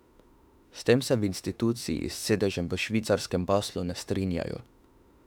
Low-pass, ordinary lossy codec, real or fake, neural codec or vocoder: 19.8 kHz; none; fake; autoencoder, 48 kHz, 32 numbers a frame, DAC-VAE, trained on Japanese speech